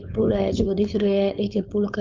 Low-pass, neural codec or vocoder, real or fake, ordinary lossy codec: 7.2 kHz; codec, 16 kHz, 4.8 kbps, FACodec; fake; Opus, 24 kbps